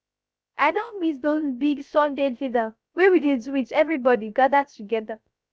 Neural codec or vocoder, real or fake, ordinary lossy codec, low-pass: codec, 16 kHz, 0.3 kbps, FocalCodec; fake; none; none